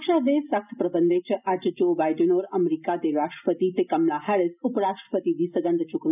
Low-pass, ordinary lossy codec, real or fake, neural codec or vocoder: 3.6 kHz; none; real; none